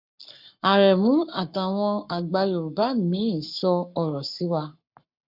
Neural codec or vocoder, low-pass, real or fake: codec, 44.1 kHz, 7.8 kbps, Pupu-Codec; 5.4 kHz; fake